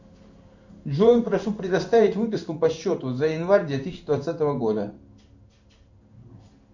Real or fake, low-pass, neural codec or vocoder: fake; 7.2 kHz; codec, 16 kHz in and 24 kHz out, 1 kbps, XY-Tokenizer